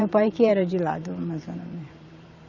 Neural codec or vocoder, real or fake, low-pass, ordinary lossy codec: vocoder, 44.1 kHz, 128 mel bands every 512 samples, BigVGAN v2; fake; 7.2 kHz; none